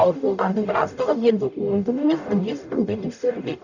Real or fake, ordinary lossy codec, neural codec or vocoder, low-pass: fake; none; codec, 44.1 kHz, 0.9 kbps, DAC; 7.2 kHz